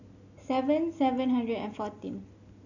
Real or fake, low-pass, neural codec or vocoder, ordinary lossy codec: real; 7.2 kHz; none; none